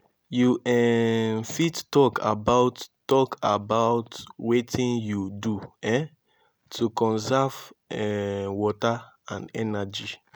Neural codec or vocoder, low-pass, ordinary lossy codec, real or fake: none; none; none; real